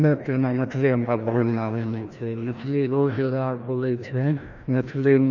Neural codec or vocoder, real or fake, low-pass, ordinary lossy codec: codec, 16 kHz, 1 kbps, FreqCodec, larger model; fake; 7.2 kHz; none